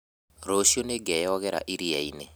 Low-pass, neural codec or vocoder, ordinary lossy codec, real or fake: none; none; none; real